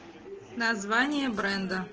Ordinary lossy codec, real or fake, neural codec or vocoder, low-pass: Opus, 16 kbps; real; none; 7.2 kHz